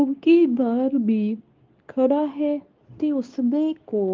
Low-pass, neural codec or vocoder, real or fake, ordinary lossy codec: 7.2 kHz; codec, 24 kHz, 0.9 kbps, WavTokenizer, medium speech release version 2; fake; Opus, 24 kbps